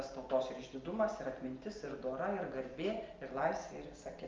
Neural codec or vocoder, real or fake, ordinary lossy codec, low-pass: none; real; Opus, 24 kbps; 7.2 kHz